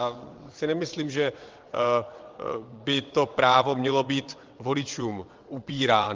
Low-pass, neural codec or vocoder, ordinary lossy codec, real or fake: 7.2 kHz; vocoder, 22.05 kHz, 80 mel bands, WaveNeXt; Opus, 32 kbps; fake